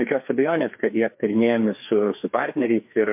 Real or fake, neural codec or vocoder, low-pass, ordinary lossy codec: fake; codec, 16 kHz, 2 kbps, FreqCodec, larger model; 3.6 kHz; MP3, 32 kbps